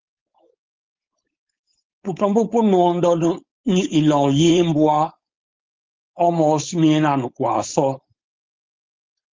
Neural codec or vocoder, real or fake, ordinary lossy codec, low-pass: codec, 16 kHz, 4.8 kbps, FACodec; fake; Opus, 32 kbps; 7.2 kHz